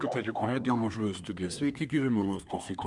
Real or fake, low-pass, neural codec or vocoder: fake; 10.8 kHz; codec, 24 kHz, 1 kbps, SNAC